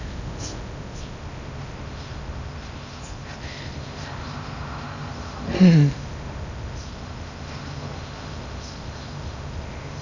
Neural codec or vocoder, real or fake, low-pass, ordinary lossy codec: codec, 16 kHz in and 24 kHz out, 0.6 kbps, FocalCodec, streaming, 2048 codes; fake; 7.2 kHz; none